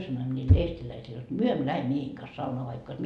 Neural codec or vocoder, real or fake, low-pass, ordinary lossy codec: none; real; none; none